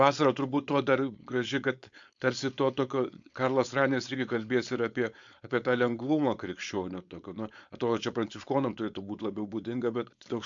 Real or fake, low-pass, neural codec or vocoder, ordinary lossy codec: fake; 7.2 kHz; codec, 16 kHz, 4.8 kbps, FACodec; MP3, 64 kbps